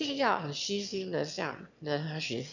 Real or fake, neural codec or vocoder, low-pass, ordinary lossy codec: fake; autoencoder, 22.05 kHz, a latent of 192 numbers a frame, VITS, trained on one speaker; 7.2 kHz; none